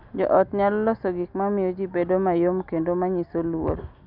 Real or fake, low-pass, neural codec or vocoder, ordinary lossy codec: real; 5.4 kHz; none; none